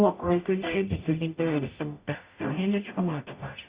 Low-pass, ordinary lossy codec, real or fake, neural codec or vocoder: 3.6 kHz; Opus, 64 kbps; fake; codec, 44.1 kHz, 0.9 kbps, DAC